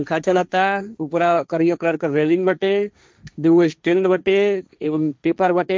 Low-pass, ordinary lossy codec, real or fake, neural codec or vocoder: none; none; fake; codec, 16 kHz, 1.1 kbps, Voila-Tokenizer